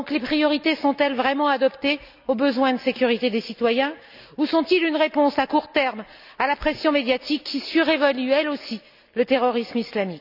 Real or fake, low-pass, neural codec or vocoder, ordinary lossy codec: real; 5.4 kHz; none; none